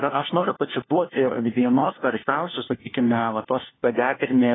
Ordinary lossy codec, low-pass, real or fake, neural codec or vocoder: AAC, 16 kbps; 7.2 kHz; fake; codec, 16 kHz, 1 kbps, FunCodec, trained on LibriTTS, 50 frames a second